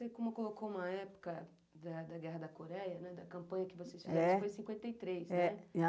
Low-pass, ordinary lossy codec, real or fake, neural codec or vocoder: none; none; real; none